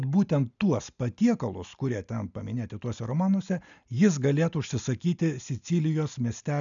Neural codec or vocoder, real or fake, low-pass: none; real; 7.2 kHz